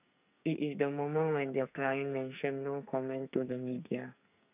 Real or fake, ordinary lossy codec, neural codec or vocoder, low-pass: fake; none; codec, 44.1 kHz, 2.6 kbps, SNAC; 3.6 kHz